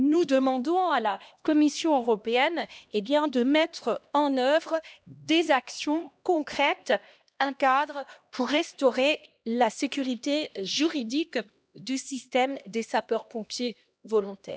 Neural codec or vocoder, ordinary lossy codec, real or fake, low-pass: codec, 16 kHz, 1 kbps, X-Codec, HuBERT features, trained on LibriSpeech; none; fake; none